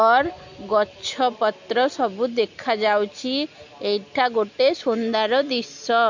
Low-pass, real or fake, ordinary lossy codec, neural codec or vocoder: 7.2 kHz; real; MP3, 48 kbps; none